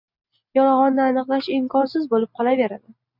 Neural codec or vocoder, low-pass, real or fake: none; 5.4 kHz; real